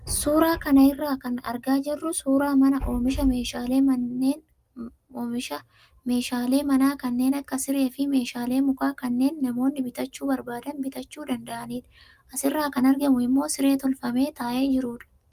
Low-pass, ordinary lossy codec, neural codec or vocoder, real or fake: 14.4 kHz; Opus, 32 kbps; none; real